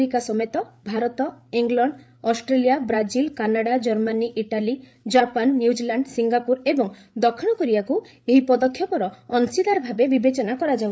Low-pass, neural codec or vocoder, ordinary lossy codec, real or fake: none; codec, 16 kHz, 8 kbps, FreqCodec, larger model; none; fake